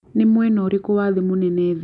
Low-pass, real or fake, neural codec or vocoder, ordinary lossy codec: 10.8 kHz; real; none; none